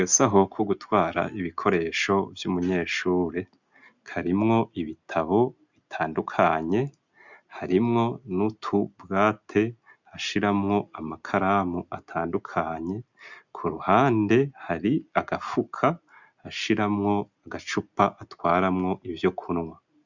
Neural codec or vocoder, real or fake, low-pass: none; real; 7.2 kHz